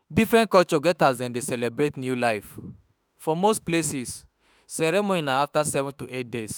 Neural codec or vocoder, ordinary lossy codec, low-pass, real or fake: autoencoder, 48 kHz, 32 numbers a frame, DAC-VAE, trained on Japanese speech; none; none; fake